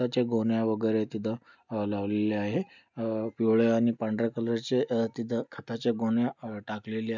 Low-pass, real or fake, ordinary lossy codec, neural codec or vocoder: 7.2 kHz; real; none; none